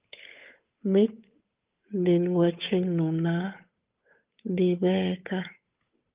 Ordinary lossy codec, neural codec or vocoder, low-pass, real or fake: Opus, 32 kbps; codec, 16 kHz, 8 kbps, FunCodec, trained on Chinese and English, 25 frames a second; 3.6 kHz; fake